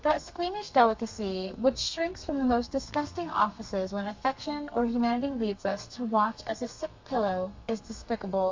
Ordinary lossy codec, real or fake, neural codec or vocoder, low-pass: MP3, 48 kbps; fake; codec, 32 kHz, 1.9 kbps, SNAC; 7.2 kHz